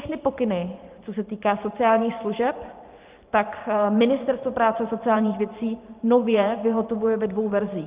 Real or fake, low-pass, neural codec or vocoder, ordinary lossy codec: fake; 3.6 kHz; vocoder, 44.1 kHz, 128 mel bands every 512 samples, BigVGAN v2; Opus, 24 kbps